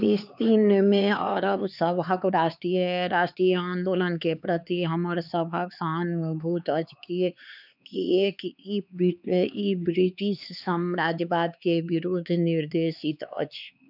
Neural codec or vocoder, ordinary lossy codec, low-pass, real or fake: codec, 16 kHz, 4 kbps, X-Codec, HuBERT features, trained on LibriSpeech; none; 5.4 kHz; fake